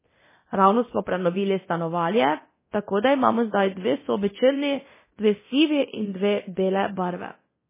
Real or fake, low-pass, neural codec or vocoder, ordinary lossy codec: fake; 3.6 kHz; codec, 24 kHz, 0.9 kbps, DualCodec; MP3, 16 kbps